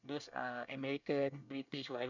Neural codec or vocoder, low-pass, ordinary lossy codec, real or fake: codec, 24 kHz, 1 kbps, SNAC; 7.2 kHz; none; fake